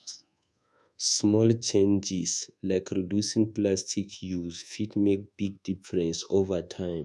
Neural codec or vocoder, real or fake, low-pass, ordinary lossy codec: codec, 24 kHz, 1.2 kbps, DualCodec; fake; none; none